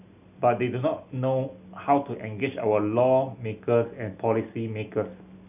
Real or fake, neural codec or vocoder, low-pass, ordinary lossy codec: real; none; 3.6 kHz; none